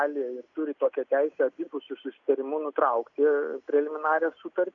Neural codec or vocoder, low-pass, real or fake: none; 7.2 kHz; real